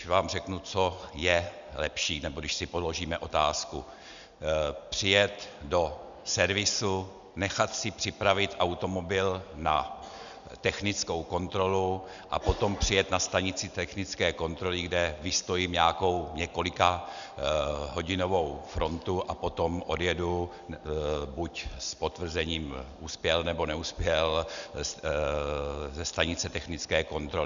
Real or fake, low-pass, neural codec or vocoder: real; 7.2 kHz; none